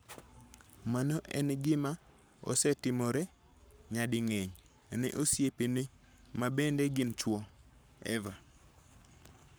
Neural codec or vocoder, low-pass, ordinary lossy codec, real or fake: codec, 44.1 kHz, 7.8 kbps, Pupu-Codec; none; none; fake